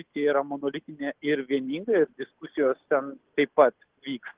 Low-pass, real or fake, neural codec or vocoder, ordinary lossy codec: 3.6 kHz; real; none; Opus, 16 kbps